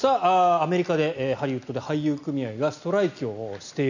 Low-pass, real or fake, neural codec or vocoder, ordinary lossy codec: 7.2 kHz; real; none; none